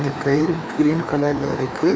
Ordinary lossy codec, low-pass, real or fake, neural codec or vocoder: none; none; fake; codec, 16 kHz, 2 kbps, FreqCodec, larger model